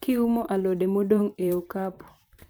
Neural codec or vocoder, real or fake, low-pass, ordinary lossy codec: vocoder, 44.1 kHz, 128 mel bands, Pupu-Vocoder; fake; none; none